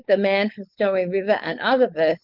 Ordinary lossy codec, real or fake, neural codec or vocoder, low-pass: Opus, 32 kbps; fake; codec, 16 kHz, 4.8 kbps, FACodec; 5.4 kHz